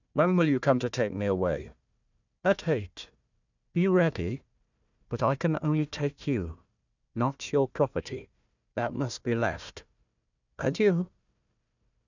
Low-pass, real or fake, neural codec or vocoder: 7.2 kHz; fake; codec, 16 kHz, 1 kbps, FunCodec, trained on Chinese and English, 50 frames a second